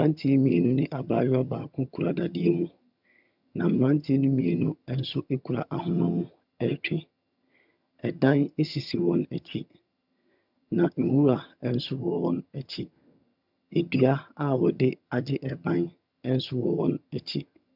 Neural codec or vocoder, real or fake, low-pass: vocoder, 22.05 kHz, 80 mel bands, HiFi-GAN; fake; 5.4 kHz